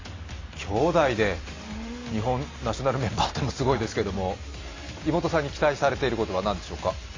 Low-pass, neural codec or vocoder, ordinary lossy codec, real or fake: 7.2 kHz; none; AAC, 32 kbps; real